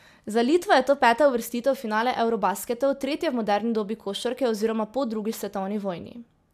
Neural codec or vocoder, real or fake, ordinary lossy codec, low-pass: none; real; MP3, 96 kbps; 14.4 kHz